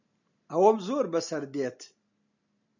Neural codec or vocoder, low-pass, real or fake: none; 7.2 kHz; real